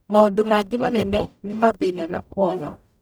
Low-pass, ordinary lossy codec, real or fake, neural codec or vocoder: none; none; fake; codec, 44.1 kHz, 0.9 kbps, DAC